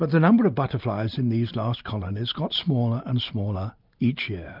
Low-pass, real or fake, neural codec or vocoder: 5.4 kHz; real; none